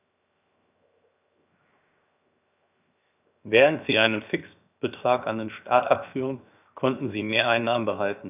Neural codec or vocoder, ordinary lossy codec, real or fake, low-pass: codec, 16 kHz, 0.7 kbps, FocalCodec; none; fake; 3.6 kHz